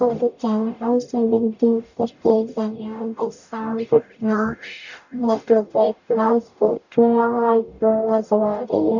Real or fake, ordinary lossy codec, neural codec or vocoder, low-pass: fake; none; codec, 44.1 kHz, 0.9 kbps, DAC; 7.2 kHz